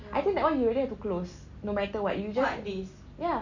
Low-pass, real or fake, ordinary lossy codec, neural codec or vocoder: 7.2 kHz; real; none; none